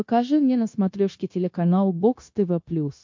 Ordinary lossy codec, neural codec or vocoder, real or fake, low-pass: MP3, 48 kbps; codec, 16 kHz, 0.9 kbps, LongCat-Audio-Codec; fake; 7.2 kHz